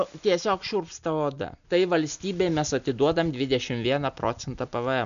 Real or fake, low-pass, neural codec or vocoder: real; 7.2 kHz; none